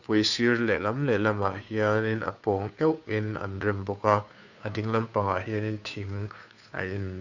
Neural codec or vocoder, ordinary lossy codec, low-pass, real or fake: codec, 16 kHz, 2 kbps, FunCodec, trained on Chinese and English, 25 frames a second; none; 7.2 kHz; fake